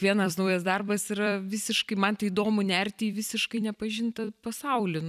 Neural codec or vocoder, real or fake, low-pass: vocoder, 44.1 kHz, 128 mel bands every 256 samples, BigVGAN v2; fake; 14.4 kHz